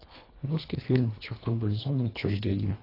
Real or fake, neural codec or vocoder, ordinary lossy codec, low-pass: fake; codec, 24 kHz, 1.5 kbps, HILCodec; AAC, 24 kbps; 5.4 kHz